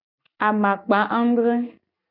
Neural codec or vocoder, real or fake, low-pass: none; real; 5.4 kHz